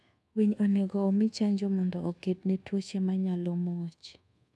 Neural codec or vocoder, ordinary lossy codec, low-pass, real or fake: codec, 24 kHz, 1.2 kbps, DualCodec; none; none; fake